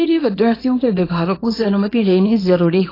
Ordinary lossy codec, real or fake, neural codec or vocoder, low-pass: AAC, 24 kbps; fake; codec, 24 kHz, 0.9 kbps, WavTokenizer, small release; 5.4 kHz